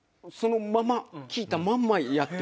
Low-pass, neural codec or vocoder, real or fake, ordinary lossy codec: none; none; real; none